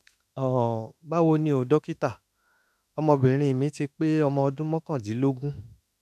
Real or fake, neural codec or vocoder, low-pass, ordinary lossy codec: fake; autoencoder, 48 kHz, 32 numbers a frame, DAC-VAE, trained on Japanese speech; 14.4 kHz; none